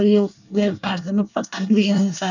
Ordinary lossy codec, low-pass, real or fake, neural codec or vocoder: none; 7.2 kHz; fake; codec, 24 kHz, 1 kbps, SNAC